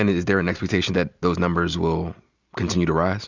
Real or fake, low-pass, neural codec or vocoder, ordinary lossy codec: real; 7.2 kHz; none; Opus, 64 kbps